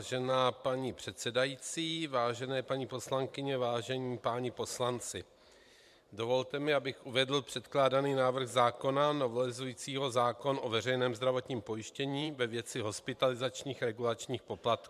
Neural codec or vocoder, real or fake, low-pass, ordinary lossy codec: vocoder, 44.1 kHz, 128 mel bands every 512 samples, BigVGAN v2; fake; 14.4 kHz; MP3, 96 kbps